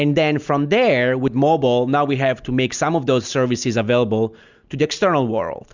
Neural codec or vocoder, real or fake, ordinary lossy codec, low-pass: none; real; Opus, 64 kbps; 7.2 kHz